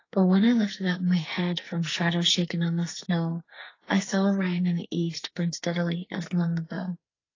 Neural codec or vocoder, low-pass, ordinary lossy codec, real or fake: codec, 44.1 kHz, 2.6 kbps, SNAC; 7.2 kHz; AAC, 32 kbps; fake